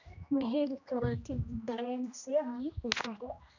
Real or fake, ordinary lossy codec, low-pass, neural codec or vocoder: fake; none; 7.2 kHz; codec, 16 kHz, 1 kbps, X-Codec, HuBERT features, trained on general audio